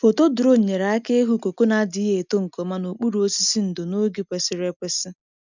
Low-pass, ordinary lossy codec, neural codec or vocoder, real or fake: 7.2 kHz; none; none; real